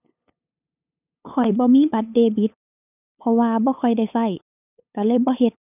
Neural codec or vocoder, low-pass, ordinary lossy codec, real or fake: codec, 16 kHz, 8 kbps, FunCodec, trained on LibriTTS, 25 frames a second; 3.6 kHz; none; fake